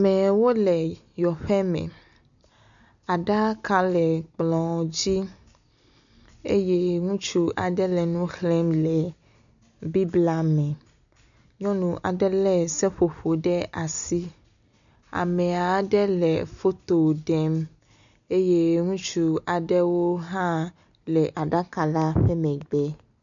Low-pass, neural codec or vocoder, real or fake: 7.2 kHz; none; real